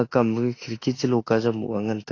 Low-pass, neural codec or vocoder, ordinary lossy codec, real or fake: 7.2 kHz; autoencoder, 48 kHz, 32 numbers a frame, DAC-VAE, trained on Japanese speech; AAC, 32 kbps; fake